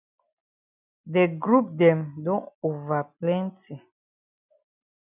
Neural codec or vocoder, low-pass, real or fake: none; 3.6 kHz; real